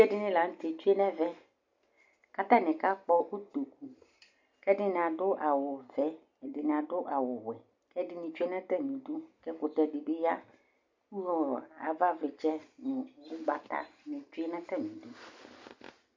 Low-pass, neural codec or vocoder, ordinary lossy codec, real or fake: 7.2 kHz; none; MP3, 48 kbps; real